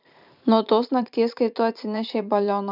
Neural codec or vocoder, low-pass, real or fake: vocoder, 44.1 kHz, 128 mel bands every 512 samples, BigVGAN v2; 5.4 kHz; fake